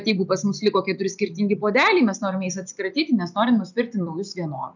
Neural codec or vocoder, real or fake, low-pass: none; real; 7.2 kHz